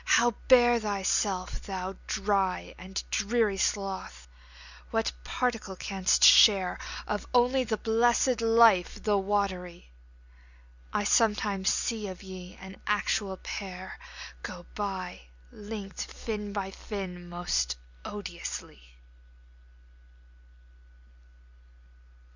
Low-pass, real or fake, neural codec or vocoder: 7.2 kHz; real; none